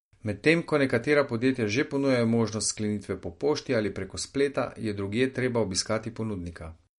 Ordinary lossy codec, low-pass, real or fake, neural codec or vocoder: MP3, 48 kbps; 19.8 kHz; real; none